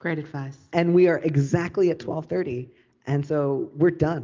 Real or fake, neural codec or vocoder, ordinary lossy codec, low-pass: real; none; Opus, 24 kbps; 7.2 kHz